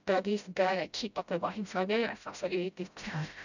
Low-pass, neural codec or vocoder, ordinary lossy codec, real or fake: 7.2 kHz; codec, 16 kHz, 0.5 kbps, FreqCodec, smaller model; none; fake